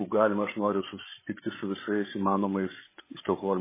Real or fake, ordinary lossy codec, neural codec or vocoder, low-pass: fake; MP3, 16 kbps; codec, 16 kHz, 16 kbps, FreqCodec, larger model; 3.6 kHz